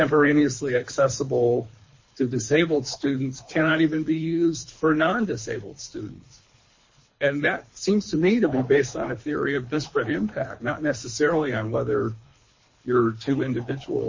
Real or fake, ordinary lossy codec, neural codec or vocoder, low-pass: fake; MP3, 32 kbps; codec, 24 kHz, 3 kbps, HILCodec; 7.2 kHz